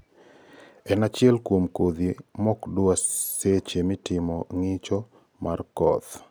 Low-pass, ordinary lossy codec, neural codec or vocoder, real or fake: none; none; none; real